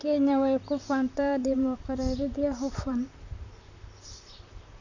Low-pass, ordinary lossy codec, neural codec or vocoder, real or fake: 7.2 kHz; none; vocoder, 44.1 kHz, 128 mel bands, Pupu-Vocoder; fake